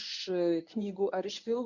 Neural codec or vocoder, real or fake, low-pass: codec, 24 kHz, 0.9 kbps, WavTokenizer, medium speech release version 1; fake; 7.2 kHz